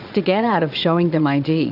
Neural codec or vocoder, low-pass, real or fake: vocoder, 22.05 kHz, 80 mel bands, Vocos; 5.4 kHz; fake